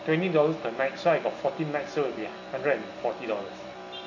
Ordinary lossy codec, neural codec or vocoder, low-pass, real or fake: none; none; 7.2 kHz; real